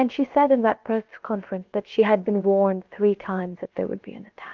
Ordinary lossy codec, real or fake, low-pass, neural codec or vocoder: Opus, 32 kbps; fake; 7.2 kHz; codec, 16 kHz, about 1 kbps, DyCAST, with the encoder's durations